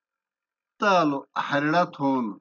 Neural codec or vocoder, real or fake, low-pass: none; real; 7.2 kHz